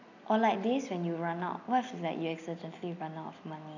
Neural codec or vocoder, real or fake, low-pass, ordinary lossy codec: none; real; 7.2 kHz; none